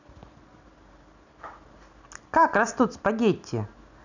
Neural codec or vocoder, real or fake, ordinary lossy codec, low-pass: none; real; none; 7.2 kHz